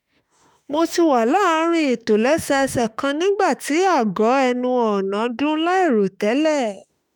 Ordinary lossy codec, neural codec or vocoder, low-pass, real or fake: none; autoencoder, 48 kHz, 32 numbers a frame, DAC-VAE, trained on Japanese speech; none; fake